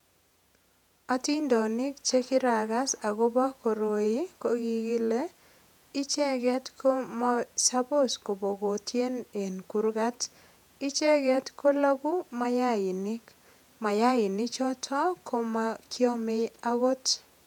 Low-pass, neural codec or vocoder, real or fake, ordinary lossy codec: 19.8 kHz; vocoder, 48 kHz, 128 mel bands, Vocos; fake; none